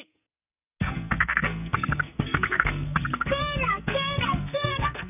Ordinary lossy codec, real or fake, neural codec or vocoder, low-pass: none; fake; codec, 44.1 kHz, 2.6 kbps, SNAC; 3.6 kHz